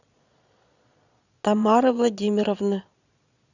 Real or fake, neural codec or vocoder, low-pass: fake; vocoder, 22.05 kHz, 80 mel bands, Vocos; 7.2 kHz